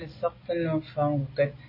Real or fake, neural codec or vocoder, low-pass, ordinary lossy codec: real; none; 5.4 kHz; MP3, 24 kbps